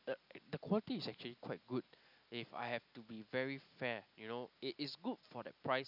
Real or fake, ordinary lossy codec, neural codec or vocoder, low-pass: real; none; none; 5.4 kHz